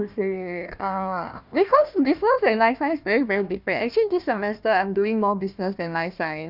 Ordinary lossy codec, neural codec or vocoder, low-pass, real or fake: none; codec, 16 kHz, 1 kbps, FunCodec, trained on Chinese and English, 50 frames a second; 5.4 kHz; fake